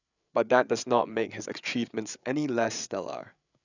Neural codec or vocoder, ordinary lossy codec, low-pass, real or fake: codec, 16 kHz, 8 kbps, FreqCodec, larger model; none; 7.2 kHz; fake